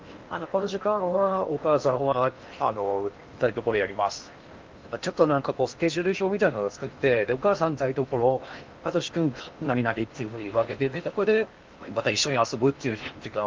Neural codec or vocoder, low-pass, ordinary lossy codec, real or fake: codec, 16 kHz in and 24 kHz out, 0.8 kbps, FocalCodec, streaming, 65536 codes; 7.2 kHz; Opus, 24 kbps; fake